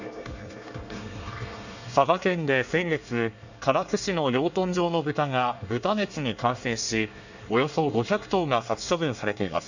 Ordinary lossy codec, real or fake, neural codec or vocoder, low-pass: none; fake; codec, 24 kHz, 1 kbps, SNAC; 7.2 kHz